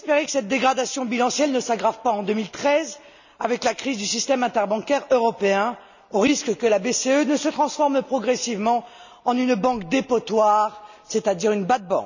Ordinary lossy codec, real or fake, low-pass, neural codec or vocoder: none; real; 7.2 kHz; none